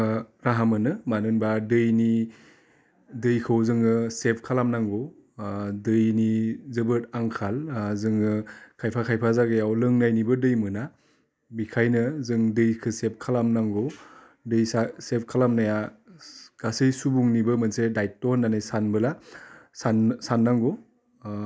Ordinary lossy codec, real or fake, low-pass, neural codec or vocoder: none; real; none; none